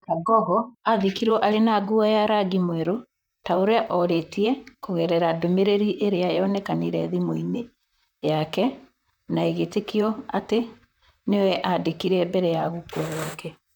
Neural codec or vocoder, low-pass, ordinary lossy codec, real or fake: vocoder, 44.1 kHz, 128 mel bands, Pupu-Vocoder; 19.8 kHz; none; fake